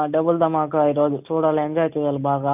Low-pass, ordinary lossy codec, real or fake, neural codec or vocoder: 3.6 kHz; none; real; none